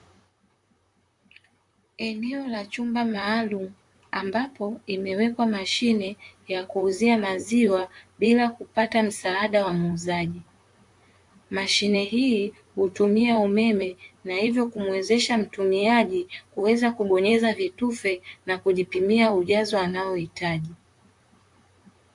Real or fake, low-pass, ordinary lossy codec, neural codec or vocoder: fake; 10.8 kHz; AAC, 64 kbps; vocoder, 44.1 kHz, 128 mel bands, Pupu-Vocoder